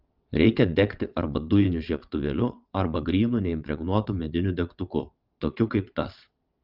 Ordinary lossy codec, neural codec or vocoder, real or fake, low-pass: Opus, 32 kbps; vocoder, 22.05 kHz, 80 mel bands, WaveNeXt; fake; 5.4 kHz